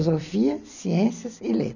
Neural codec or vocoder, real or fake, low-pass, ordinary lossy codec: none; real; 7.2 kHz; none